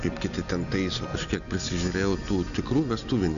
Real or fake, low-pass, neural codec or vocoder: real; 7.2 kHz; none